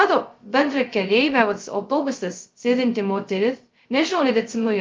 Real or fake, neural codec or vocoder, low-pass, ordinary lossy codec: fake; codec, 16 kHz, 0.2 kbps, FocalCodec; 7.2 kHz; Opus, 32 kbps